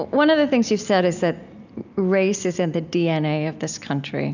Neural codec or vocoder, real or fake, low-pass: none; real; 7.2 kHz